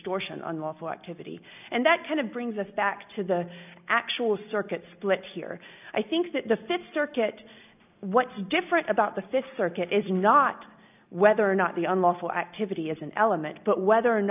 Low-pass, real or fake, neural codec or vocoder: 3.6 kHz; real; none